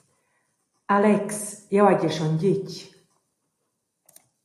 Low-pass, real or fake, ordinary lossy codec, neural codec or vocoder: 14.4 kHz; real; AAC, 48 kbps; none